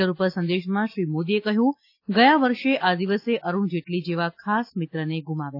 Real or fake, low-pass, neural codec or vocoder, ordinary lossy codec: real; 5.4 kHz; none; AAC, 32 kbps